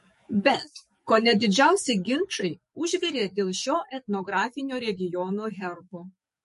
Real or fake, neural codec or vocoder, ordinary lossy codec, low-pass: fake; codec, 44.1 kHz, 7.8 kbps, DAC; MP3, 48 kbps; 14.4 kHz